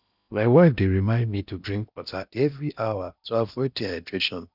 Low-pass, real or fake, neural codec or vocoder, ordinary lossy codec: 5.4 kHz; fake; codec, 16 kHz in and 24 kHz out, 0.8 kbps, FocalCodec, streaming, 65536 codes; none